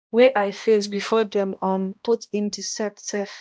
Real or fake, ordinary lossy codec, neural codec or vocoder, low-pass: fake; none; codec, 16 kHz, 1 kbps, X-Codec, HuBERT features, trained on balanced general audio; none